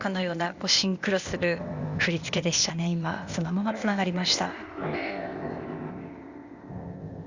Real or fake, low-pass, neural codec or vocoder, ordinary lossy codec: fake; 7.2 kHz; codec, 16 kHz, 0.8 kbps, ZipCodec; Opus, 64 kbps